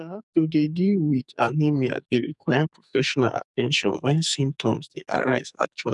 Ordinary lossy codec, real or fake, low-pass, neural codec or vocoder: none; fake; 10.8 kHz; codec, 44.1 kHz, 2.6 kbps, SNAC